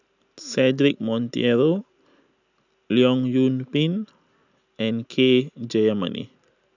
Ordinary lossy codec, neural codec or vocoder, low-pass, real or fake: none; none; 7.2 kHz; real